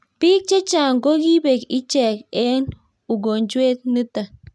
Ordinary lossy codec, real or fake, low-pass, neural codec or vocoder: none; real; none; none